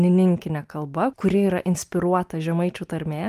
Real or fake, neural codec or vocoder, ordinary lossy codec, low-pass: real; none; Opus, 32 kbps; 14.4 kHz